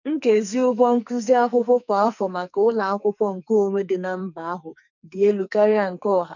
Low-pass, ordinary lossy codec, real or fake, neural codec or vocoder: 7.2 kHz; none; fake; codec, 44.1 kHz, 2.6 kbps, SNAC